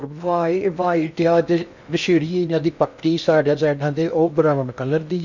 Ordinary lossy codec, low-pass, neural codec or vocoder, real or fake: none; 7.2 kHz; codec, 16 kHz in and 24 kHz out, 0.6 kbps, FocalCodec, streaming, 2048 codes; fake